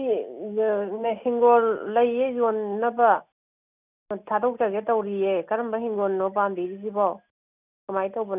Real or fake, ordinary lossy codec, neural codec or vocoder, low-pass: real; none; none; 3.6 kHz